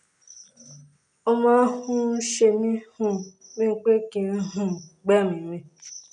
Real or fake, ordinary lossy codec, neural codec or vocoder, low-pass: real; none; none; 10.8 kHz